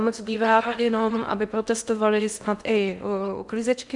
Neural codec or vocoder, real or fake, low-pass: codec, 16 kHz in and 24 kHz out, 0.6 kbps, FocalCodec, streaming, 2048 codes; fake; 10.8 kHz